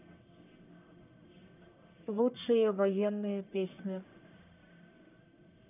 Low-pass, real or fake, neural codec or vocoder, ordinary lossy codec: 3.6 kHz; fake; codec, 44.1 kHz, 1.7 kbps, Pupu-Codec; none